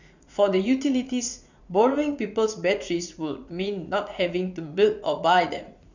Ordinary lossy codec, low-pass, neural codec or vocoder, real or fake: none; 7.2 kHz; vocoder, 44.1 kHz, 80 mel bands, Vocos; fake